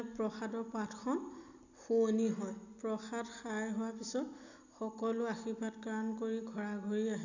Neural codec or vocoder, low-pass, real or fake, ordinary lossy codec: none; 7.2 kHz; real; none